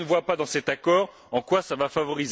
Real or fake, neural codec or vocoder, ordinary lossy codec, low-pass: real; none; none; none